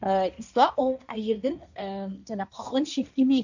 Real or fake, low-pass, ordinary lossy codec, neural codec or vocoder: fake; 7.2 kHz; none; codec, 16 kHz, 1.1 kbps, Voila-Tokenizer